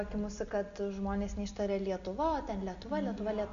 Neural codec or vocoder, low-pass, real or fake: none; 7.2 kHz; real